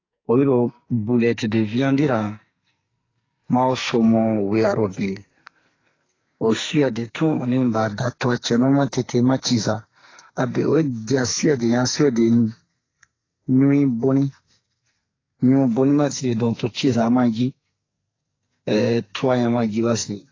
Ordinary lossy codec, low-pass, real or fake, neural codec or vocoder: AAC, 32 kbps; 7.2 kHz; fake; codec, 44.1 kHz, 2.6 kbps, SNAC